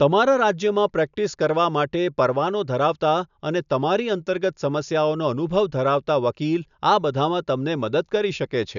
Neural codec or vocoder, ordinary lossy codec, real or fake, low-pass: none; none; real; 7.2 kHz